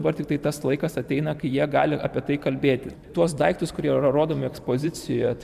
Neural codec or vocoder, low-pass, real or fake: vocoder, 44.1 kHz, 128 mel bands every 512 samples, BigVGAN v2; 14.4 kHz; fake